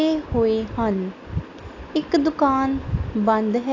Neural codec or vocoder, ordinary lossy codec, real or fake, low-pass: none; AAC, 48 kbps; real; 7.2 kHz